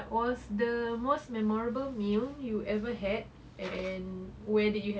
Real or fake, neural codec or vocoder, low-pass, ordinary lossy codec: real; none; none; none